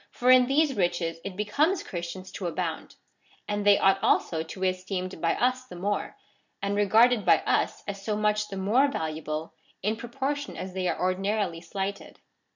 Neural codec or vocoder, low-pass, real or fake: none; 7.2 kHz; real